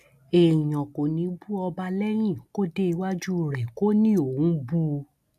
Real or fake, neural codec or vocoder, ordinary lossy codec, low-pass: real; none; none; 14.4 kHz